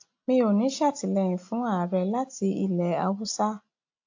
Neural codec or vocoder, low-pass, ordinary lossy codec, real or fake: none; 7.2 kHz; AAC, 48 kbps; real